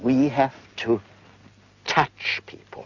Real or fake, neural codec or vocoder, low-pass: real; none; 7.2 kHz